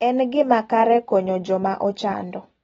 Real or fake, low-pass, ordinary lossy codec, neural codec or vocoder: real; 14.4 kHz; AAC, 24 kbps; none